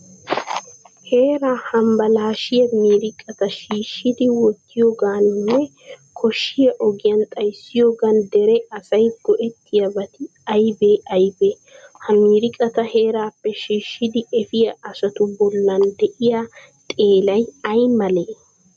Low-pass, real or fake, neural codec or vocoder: 9.9 kHz; real; none